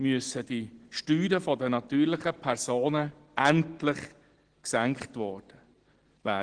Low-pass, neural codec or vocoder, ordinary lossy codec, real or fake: 9.9 kHz; none; Opus, 16 kbps; real